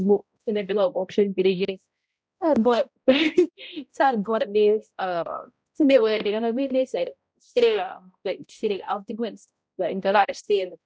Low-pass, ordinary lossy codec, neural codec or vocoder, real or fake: none; none; codec, 16 kHz, 0.5 kbps, X-Codec, HuBERT features, trained on balanced general audio; fake